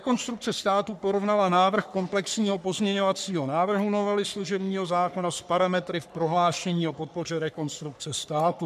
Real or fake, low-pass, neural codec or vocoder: fake; 14.4 kHz; codec, 44.1 kHz, 3.4 kbps, Pupu-Codec